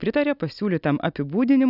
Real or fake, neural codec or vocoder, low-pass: real; none; 5.4 kHz